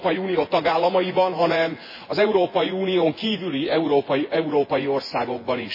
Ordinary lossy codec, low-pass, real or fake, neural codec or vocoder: MP3, 24 kbps; 5.4 kHz; fake; vocoder, 24 kHz, 100 mel bands, Vocos